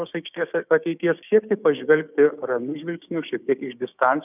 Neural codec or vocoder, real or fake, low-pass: codec, 16 kHz, 6 kbps, DAC; fake; 3.6 kHz